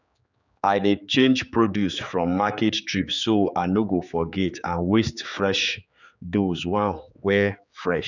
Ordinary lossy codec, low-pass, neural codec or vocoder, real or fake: none; 7.2 kHz; codec, 16 kHz, 4 kbps, X-Codec, HuBERT features, trained on general audio; fake